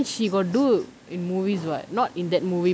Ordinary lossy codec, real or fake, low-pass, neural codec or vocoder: none; real; none; none